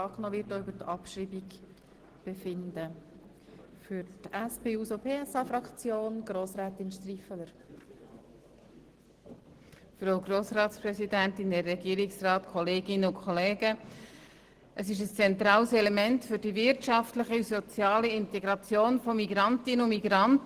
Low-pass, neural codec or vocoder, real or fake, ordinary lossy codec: 14.4 kHz; none; real; Opus, 16 kbps